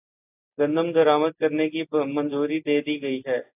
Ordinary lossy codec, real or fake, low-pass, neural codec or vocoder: AAC, 32 kbps; real; 3.6 kHz; none